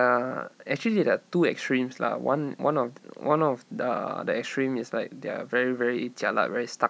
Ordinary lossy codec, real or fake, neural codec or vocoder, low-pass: none; real; none; none